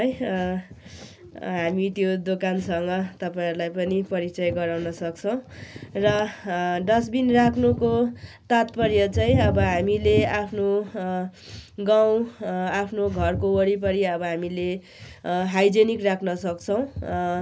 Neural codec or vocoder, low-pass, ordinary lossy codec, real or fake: none; none; none; real